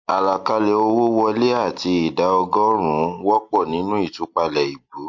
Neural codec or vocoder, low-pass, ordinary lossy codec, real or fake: none; 7.2 kHz; MP3, 48 kbps; real